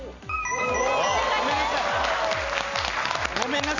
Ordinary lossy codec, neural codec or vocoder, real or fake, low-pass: none; none; real; 7.2 kHz